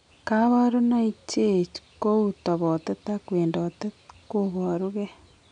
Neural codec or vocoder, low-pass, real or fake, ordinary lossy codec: none; 9.9 kHz; real; none